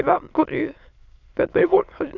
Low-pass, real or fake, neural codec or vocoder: 7.2 kHz; fake; autoencoder, 22.05 kHz, a latent of 192 numbers a frame, VITS, trained on many speakers